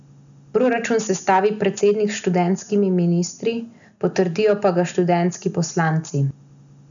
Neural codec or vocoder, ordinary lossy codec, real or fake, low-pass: none; none; real; 7.2 kHz